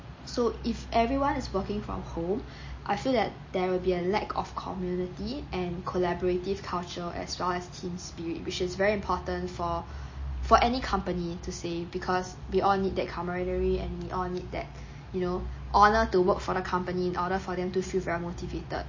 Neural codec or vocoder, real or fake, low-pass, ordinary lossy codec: none; real; 7.2 kHz; MP3, 32 kbps